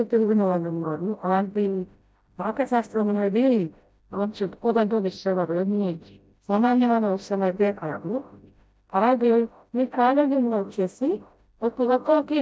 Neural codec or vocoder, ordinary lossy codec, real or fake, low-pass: codec, 16 kHz, 0.5 kbps, FreqCodec, smaller model; none; fake; none